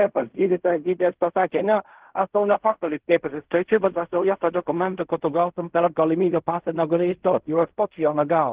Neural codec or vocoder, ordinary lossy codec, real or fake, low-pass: codec, 16 kHz in and 24 kHz out, 0.4 kbps, LongCat-Audio-Codec, fine tuned four codebook decoder; Opus, 16 kbps; fake; 3.6 kHz